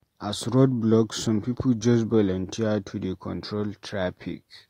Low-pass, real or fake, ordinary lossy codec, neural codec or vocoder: 19.8 kHz; real; AAC, 48 kbps; none